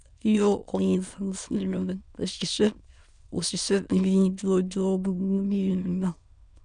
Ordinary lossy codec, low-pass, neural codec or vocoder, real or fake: none; 9.9 kHz; autoencoder, 22.05 kHz, a latent of 192 numbers a frame, VITS, trained on many speakers; fake